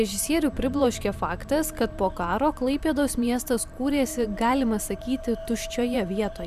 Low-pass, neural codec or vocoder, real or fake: 14.4 kHz; vocoder, 44.1 kHz, 128 mel bands every 512 samples, BigVGAN v2; fake